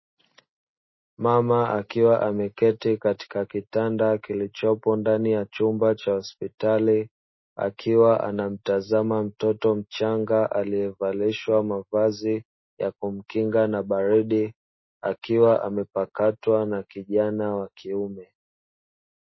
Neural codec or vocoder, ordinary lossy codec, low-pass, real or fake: none; MP3, 24 kbps; 7.2 kHz; real